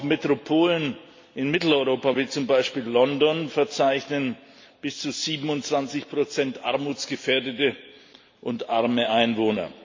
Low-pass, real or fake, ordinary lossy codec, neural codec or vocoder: 7.2 kHz; real; MP3, 48 kbps; none